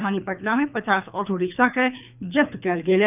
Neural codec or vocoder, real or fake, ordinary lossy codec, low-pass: codec, 24 kHz, 3 kbps, HILCodec; fake; none; 3.6 kHz